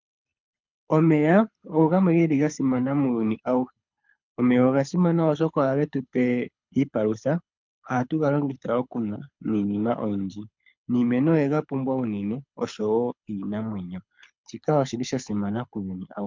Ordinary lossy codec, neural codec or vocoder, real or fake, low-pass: MP3, 64 kbps; codec, 24 kHz, 6 kbps, HILCodec; fake; 7.2 kHz